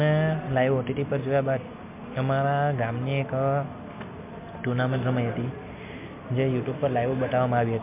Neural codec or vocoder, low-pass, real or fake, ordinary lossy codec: none; 3.6 kHz; real; MP3, 32 kbps